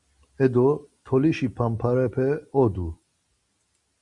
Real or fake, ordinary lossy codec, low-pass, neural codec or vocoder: real; Opus, 64 kbps; 10.8 kHz; none